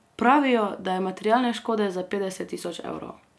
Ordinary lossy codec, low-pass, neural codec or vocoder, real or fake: none; none; none; real